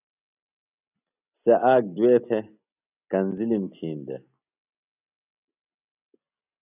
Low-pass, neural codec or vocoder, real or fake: 3.6 kHz; none; real